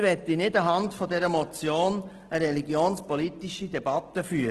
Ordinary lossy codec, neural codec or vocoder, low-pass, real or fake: Opus, 16 kbps; none; 10.8 kHz; real